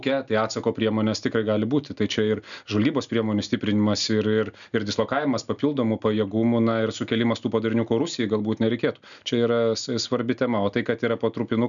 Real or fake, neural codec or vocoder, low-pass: real; none; 7.2 kHz